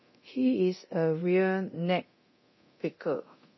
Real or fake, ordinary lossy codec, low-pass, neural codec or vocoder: fake; MP3, 24 kbps; 7.2 kHz; codec, 24 kHz, 0.9 kbps, DualCodec